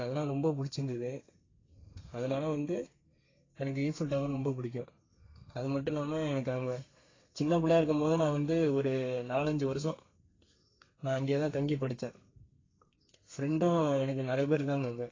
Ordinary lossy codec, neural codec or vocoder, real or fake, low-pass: AAC, 32 kbps; codec, 32 kHz, 1.9 kbps, SNAC; fake; 7.2 kHz